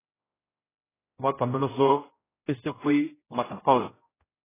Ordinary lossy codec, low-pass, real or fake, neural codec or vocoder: AAC, 16 kbps; 3.6 kHz; fake; codec, 16 kHz, 0.5 kbps, X-Codec, HuBERT features, trained on general audio